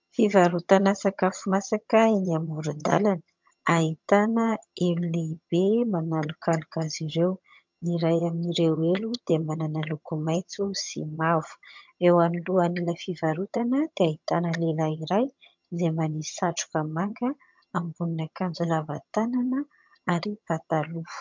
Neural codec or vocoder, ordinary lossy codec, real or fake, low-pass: vocoder, 22.05 kHz, 80 mel bands, HiFi-GAN; MP3, 64 kbps; fake; 7.2 kHz